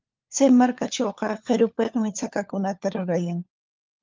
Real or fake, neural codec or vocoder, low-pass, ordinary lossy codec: fake; codec, 16 kHz, 4 kbps, FunCodec, trained on LibriTTS, 50 frames a second; 7.2 kHz; Opus, 24 kbps